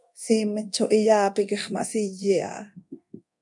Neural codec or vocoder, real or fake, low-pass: codec, 24 kHz, 0.9 kbps, DualCodec; fake; 10.8 kHz